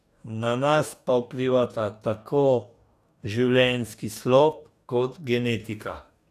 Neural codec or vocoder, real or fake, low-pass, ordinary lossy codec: codec, 44.1 kHz, 2.6 kbps, DAC; fake; 14.4 kHz; none